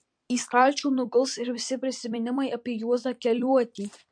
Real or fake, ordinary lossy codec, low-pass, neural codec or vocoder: fake; MP3, 48 kbps; 9.9 kHz; vocoder, 44.1 kHz, 128 mel bands every 256 samples, BigVGAN v2